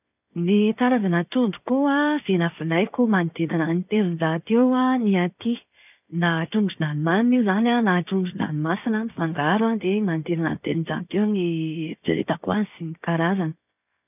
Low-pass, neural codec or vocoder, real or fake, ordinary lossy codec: 3.6 kHz; none; real; none